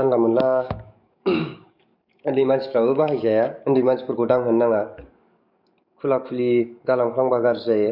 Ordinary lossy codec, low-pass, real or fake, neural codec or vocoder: none; 5.4 kHz; fake; codec, 44.1 kHz, 7.8 kbps, DAC